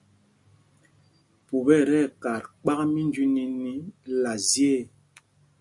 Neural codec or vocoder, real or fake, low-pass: none; real; 10.8 kHz